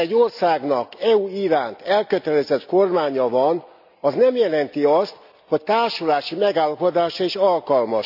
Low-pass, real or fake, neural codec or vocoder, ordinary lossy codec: 5.4 kHz; real; none; none